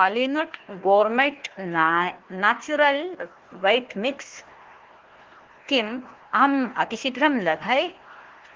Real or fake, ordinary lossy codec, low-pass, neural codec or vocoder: fake; Opus, 16 kbps; 7.2 kHz; codec, 16 kHz, 1 kbps, FunCodec, trained on Chinese and English, 50 frames a second